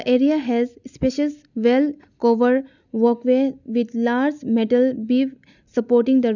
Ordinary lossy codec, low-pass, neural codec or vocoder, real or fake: none; 7.2 kHz; none; real